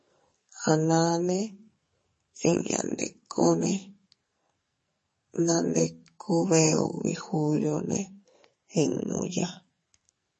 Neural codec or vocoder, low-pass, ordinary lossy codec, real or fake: codec, 44.1 kHz, 2.6 kbps, SNAC; 10.8 kHz; MP3, 32 kbps; fake